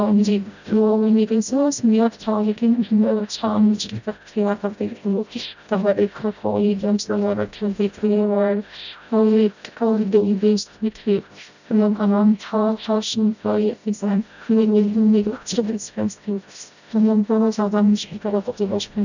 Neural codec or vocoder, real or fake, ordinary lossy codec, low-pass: codec, 16 kHz, 0.5 kbps, FreqCodec, smaller model; fake; none; 7.2 kHz